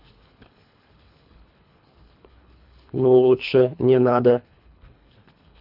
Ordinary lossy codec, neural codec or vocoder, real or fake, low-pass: none; codec, 24 kHz, 3 kbps, HILCodec; fake; 5.4 kHz